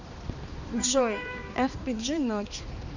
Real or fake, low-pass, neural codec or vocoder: fake; 7.2 kHz; codec, 16 kHz, 2 kbps, X-Codec, HuBERT features, trained on balanced general audio